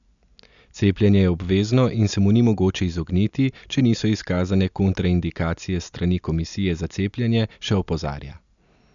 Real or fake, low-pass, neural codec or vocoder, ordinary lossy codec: real; 7.2 kHz; none; none